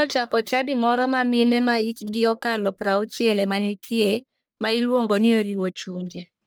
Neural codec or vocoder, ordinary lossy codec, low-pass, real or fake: codec, 44.1 kHz, 1.7 kbps, Pupu-Codec; none; none; fake